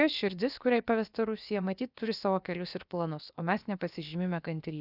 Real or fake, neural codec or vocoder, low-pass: fake; codec, 16 kHz, about 1 kbps, DyCAST, with the encoder's durations; 5.4 kHz